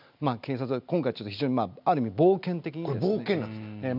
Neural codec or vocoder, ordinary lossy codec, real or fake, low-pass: none; none; real; 5.4 kHz